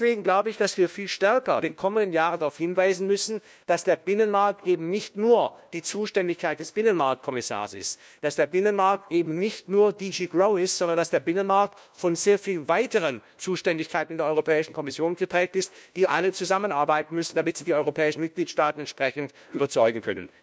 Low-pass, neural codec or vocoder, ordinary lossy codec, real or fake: none; codec, 16 kHz, 1 kbps, FunCodec, trained on LibriTTS, 50 frames a second; none; fake